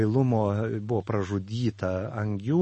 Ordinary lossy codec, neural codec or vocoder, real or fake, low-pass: MP3, 32 kbps; none; real; 10.8 kHz